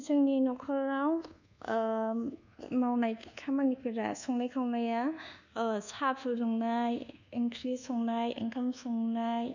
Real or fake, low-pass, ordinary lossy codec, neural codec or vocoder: fake; 7.2 kHz; none; codec, 24 kHz, 1.2 kbps, DualCodec